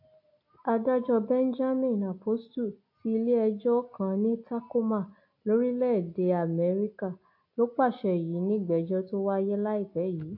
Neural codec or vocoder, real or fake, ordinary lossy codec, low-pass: none; real; AAC, 48 kbps; 5.4 kHz